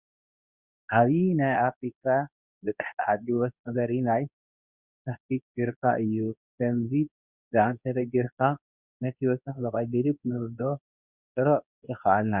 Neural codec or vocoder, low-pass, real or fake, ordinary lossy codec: codec, 24 kHz, 0.9 kbps, WavTokenizer, medium speech release version 2; 3.6 kHz; fake; Opus, 64 kbps